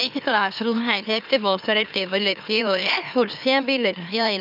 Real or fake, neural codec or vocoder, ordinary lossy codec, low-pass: fake; autoencoder, 44.1 kHz, a latent of 192 numbers a frame, MeloTTS; none; 5.4 kHz